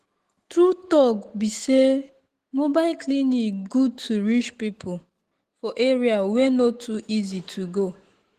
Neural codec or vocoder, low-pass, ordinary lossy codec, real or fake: autoencoder, 48 kHz, 128 numbers a frame, DAC-VAE, trained on Japanese speech; 14.4 kHz; Opus, 16 kbps; fake